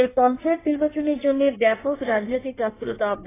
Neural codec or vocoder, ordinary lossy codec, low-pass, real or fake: codec, 16 kHz in and 24 kHz out, 1.1 kbps, FireRedTTS-2 codec; AAC, 16 kbps; 3.6 kHz; fake